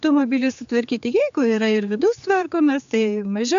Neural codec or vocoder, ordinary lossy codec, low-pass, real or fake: codec, 16 kHz, 4 kbps, X-Codec, HuBERT features, trained on general audio; MP3, 64 kbps; 7.2 kHz; fake